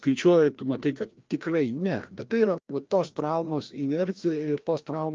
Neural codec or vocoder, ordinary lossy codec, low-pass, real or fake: codec, 16 kHz, 1 kbps, FreqCodec, larger model; Opus, 24 kbps; 7.2 kHz; fake